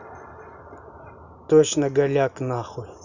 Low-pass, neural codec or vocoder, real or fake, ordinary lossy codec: 7.2 kHz; none; real; none